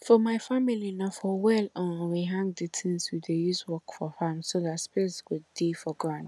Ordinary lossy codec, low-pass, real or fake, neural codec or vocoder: none; none; real; none